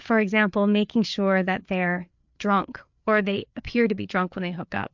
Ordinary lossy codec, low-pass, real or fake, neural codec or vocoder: MP3, 64 kbps; 7.2 kHz; fake; codec, 16 kHz, 4 kbps, FreqCodec, larger model